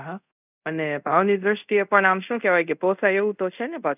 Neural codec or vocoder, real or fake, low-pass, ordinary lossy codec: codec, 24 kHz, 0.5 kbps, DualCodec; fake; 3.6 kHz; none